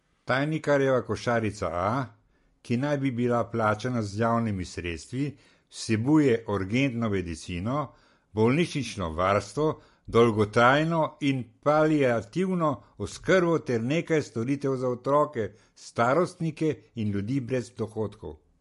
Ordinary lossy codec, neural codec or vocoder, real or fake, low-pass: MP3, 48 kbps; none; real; 14.4 kHz